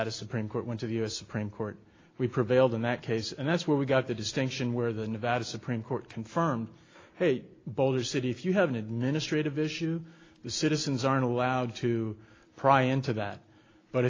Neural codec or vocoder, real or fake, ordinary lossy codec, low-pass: none; real; AAC, 32 kbps; 7.2 kHz